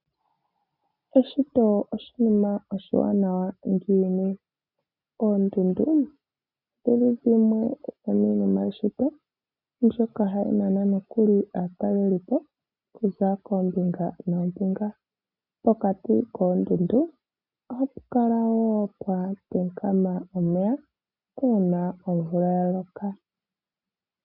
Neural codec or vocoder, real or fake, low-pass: none; real; 5.4 kHz